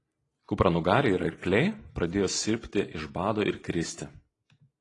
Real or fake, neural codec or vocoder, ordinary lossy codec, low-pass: real; none; AAC, 32 kbps; 10.8 kHz